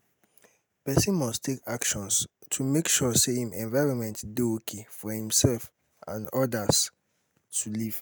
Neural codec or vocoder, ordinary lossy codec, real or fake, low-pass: none; none; real; none